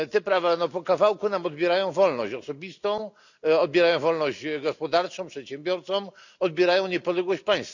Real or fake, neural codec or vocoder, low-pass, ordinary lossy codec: real; none; 7.2 kHz; none